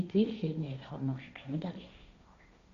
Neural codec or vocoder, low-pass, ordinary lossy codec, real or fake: codec, 16 kHz, 1.1 kbps, Voila-Tokenizer; 7.2 kHz; none; fake